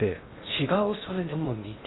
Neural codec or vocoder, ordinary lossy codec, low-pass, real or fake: codec, 16 kHz in and 24 kHz out, 0.6 kbps, FocalCodec, streaming, 2048 codes; AAC, 16 kbps; 7.2 kHz; fake